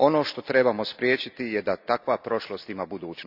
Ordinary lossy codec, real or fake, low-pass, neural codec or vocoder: none; real; 5.4 kHz; none